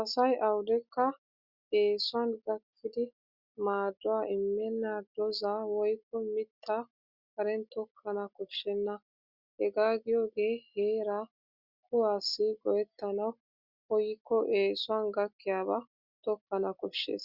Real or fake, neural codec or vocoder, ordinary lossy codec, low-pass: real; none; Opus, 64 kbps; 5.4 kHz